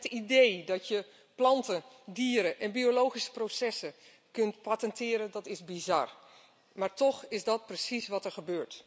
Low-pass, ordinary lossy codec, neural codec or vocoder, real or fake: none; none; none; real